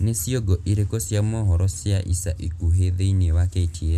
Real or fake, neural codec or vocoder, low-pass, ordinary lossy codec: real; none; 14.4 kHz; none